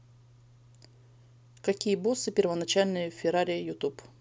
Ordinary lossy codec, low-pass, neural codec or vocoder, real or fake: none; none; none; real